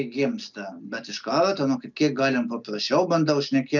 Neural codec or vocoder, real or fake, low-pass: none; real; 7.2 kHz